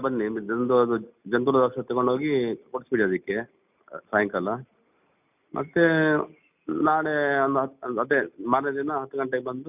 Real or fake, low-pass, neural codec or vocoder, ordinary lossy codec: real; 3.6 kHz; none; none